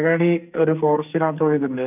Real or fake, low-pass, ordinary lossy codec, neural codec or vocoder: fake; 3.6 kHz; none; codec, 44.1 kHz, 2.6 kbps, SNAC